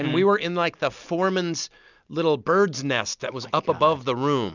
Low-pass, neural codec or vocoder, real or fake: 7.2 kHz; none; real